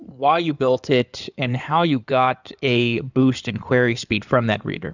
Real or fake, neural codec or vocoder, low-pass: fake; codec, 16 kHz in and 24 kHz out, 2.2 kbps, FireRedTTS-2 codec; 7.2 kHz